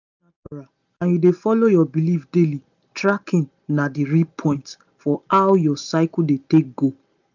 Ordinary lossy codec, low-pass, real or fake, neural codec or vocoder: none; 7.2 kHz; real; none